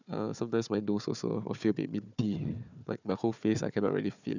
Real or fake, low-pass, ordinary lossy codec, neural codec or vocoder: fake; 7.2 kHz; none; codec, 16 kHz, 4 kbps, FunCodec, trained on Chinese and English, 50 frames a second